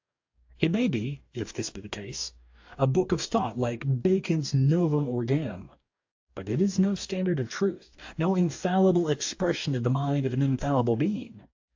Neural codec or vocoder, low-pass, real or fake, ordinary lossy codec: codec, 44.1 kHz, 2.6 kbps, DAC; 7.2 kHz; fake; AAC, 48 kbps